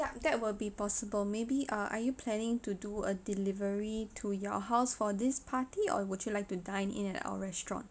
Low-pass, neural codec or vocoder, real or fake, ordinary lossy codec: none; none; real; none